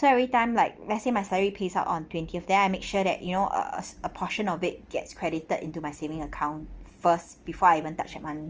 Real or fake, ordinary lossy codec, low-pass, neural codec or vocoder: real; Opus, 24 kbps; 7.2 kHz; none